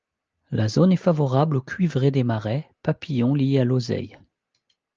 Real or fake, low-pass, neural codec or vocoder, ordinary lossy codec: real; 7.2 kHz; none; Opus, 24 kbps